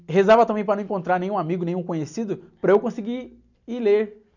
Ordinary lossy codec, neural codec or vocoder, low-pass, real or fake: none; none; 7.2 kHz; real